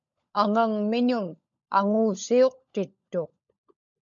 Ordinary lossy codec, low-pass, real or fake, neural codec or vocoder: MP3, 96 kbps; 7.2 kHz; fake; codec, 16 kHz, 16 kbps, FunCodec, trained on LibriTTS, 50 frames a second